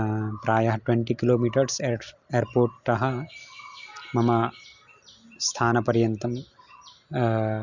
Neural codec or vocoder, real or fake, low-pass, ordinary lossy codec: none; real; 7.2 kHz; Opus, 64 kbps